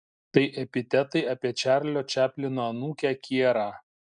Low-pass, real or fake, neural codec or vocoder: 9.9 kHz; real; none